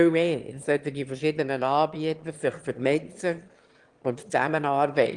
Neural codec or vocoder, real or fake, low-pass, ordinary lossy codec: autoencoder, 22.05 kHz, a latent of 192 numbers a frame, VITS, trained on one speaker; fake; 9.9 kHz; Opus, 24 kbps